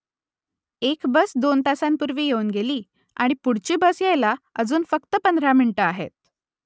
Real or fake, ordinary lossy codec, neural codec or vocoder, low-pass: real; none; none; none